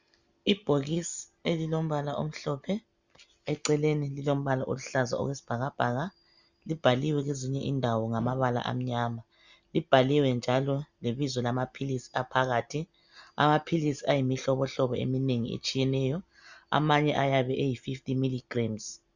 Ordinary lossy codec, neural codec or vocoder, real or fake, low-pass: Opus, 64 kbps; none; real; 7.2 kHz